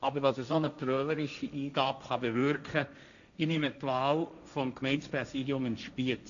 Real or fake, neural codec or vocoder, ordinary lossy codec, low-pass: fake; codec, 16 kHz, 1.1 kbps, Voila-Tokenizer; none; 7.2 kHz